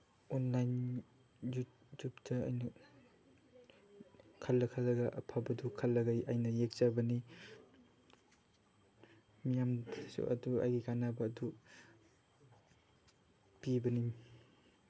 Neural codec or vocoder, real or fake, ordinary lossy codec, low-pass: none; real; none; none